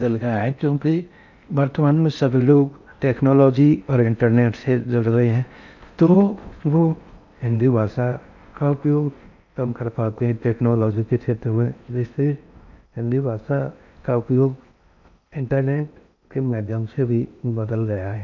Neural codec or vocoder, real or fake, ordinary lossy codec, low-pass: codec, 16 kHz in and 24 kHz out, 0.6 kbps, FocalCodec, streaming, 4096 codes; fake; none; 7.2 kHz